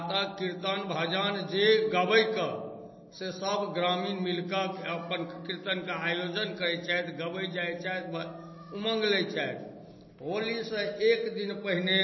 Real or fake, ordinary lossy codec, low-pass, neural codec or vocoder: real; MP3, 24 kbps; 7.2 kHz; none